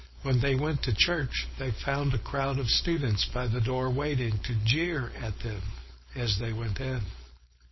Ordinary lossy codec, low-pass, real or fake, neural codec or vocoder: MP3, 24 kbps; 7.2 kHz; fake; codec, 16 kHz, 4.8 kbps, FACodec